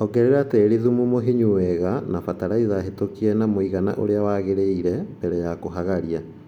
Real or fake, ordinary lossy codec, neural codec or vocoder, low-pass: real; none; none; 19.8 kHz